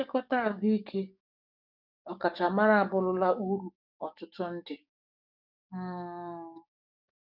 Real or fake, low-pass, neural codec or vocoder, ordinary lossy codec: fake; 5.4 kHz; codec, 44.1 kHz, 7.8 kbps, DAC; none